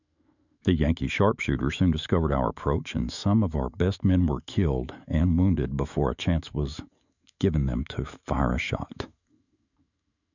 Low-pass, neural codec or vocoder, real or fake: 7.2 kHz; autoencoder, 48 kHz, 128 numbers a frame, DAC-VAE, trained on Japanese speech; fake